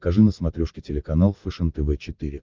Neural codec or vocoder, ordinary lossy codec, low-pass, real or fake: none; Opus, 32 kbps; 7.2 kHz; real